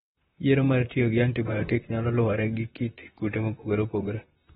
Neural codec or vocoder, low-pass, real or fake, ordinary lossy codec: vocoder, 44.1 kHz, 128 mel bands, Pupu-Vocoder; 19.8 kHz; fake; AAC, 16 kbps